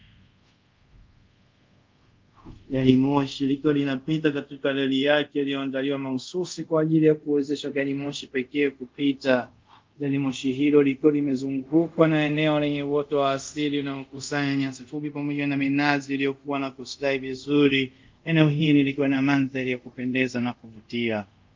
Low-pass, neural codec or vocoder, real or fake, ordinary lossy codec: 7.2 kHz; codec, 24 kHz, 0.5 kbps, DualCodec; fake; Opus, 24 kbps